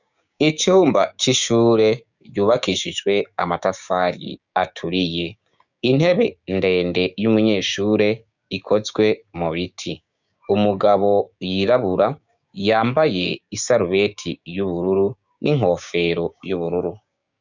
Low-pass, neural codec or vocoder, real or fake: 7.2 kHz; codec, 16 kHz, 6 kbps, DAC; fake